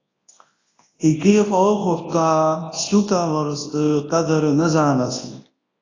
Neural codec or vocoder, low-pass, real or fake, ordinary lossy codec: codec, 24 kHz, 0.9 kbps, WavTokenizer, large speech release; 7.2 kHz; fake; AAC, 32 kbps